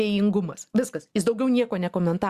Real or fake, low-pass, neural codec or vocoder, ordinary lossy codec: real; 14.4 kHz; none; Opus, 64 kbps